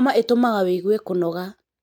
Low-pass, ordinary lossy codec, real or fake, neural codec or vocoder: 19.8 kHz; MP3, 96 kbps; real; none